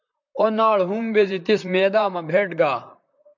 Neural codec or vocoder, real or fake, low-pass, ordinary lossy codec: vocoder, 44.1 kHz, 128 mel bands, Pupu-Vocoder; fake; 7.2 kHz; MP3, 64 kbps